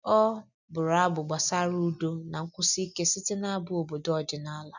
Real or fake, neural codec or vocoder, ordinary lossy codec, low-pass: real; none; none; 7.2 kHz